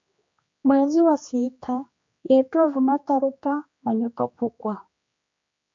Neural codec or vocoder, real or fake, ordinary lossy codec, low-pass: codec, 16 kHz, 2 kbps, X-Codec, HuBERT features, trained on general audio; fake; MP3, 48 kbps; 7.2 kHz